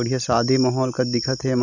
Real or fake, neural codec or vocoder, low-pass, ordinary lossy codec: real; none; 7.2 kHz; none